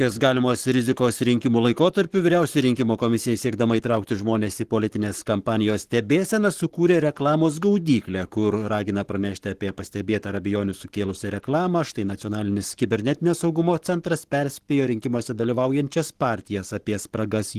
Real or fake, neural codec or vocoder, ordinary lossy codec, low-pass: fake; codec, 44.1 kHz, 7.8 kbps, Pupu-Codec; Opus, 16 kbps; 14.4 kHz